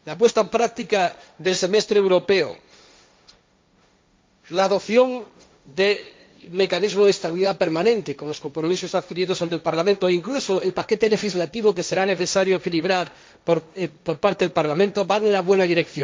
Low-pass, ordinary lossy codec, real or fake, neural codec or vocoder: none; none; fake; codec, 16 kHz, 1.1 kbps, Voila-Tokenizer